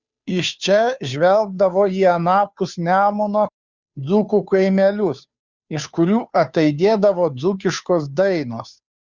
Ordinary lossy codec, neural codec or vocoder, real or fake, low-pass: Opus, 64 kbps; codec, 16 kHz, 2 kbps, FunCodec, trained on Chinese and English, 25 frames a second; fake; 7.2 kHz